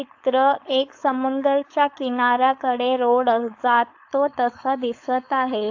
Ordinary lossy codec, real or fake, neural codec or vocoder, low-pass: AAC, 48 kbps; fake; codec, 16 kHz, 4.8 kbps, FACodec; 7.2 kHz